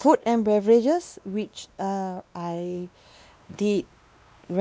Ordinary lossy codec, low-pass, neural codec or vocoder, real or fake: none; none; codec, 16 kHz, 2 kbps, X-Codec, WavLM features, trained on Multilingual LibriSpeech; fake